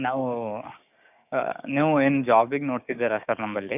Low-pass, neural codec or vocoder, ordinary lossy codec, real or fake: 3.6 kHz; codec, 16 kHz, 6 kbps, DAC; none; fake